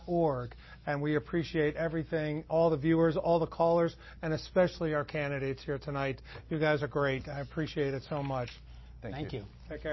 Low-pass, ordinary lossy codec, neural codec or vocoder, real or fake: 7.2 kHz; MP3, 24 kbps; none; real